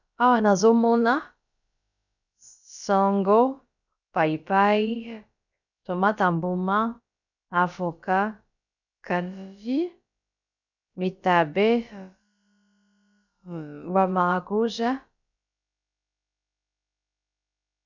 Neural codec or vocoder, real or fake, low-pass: codec, 16 kHz, about 1 kbps, DyCAST, with the encoder's durations; fake; 7.2 kHz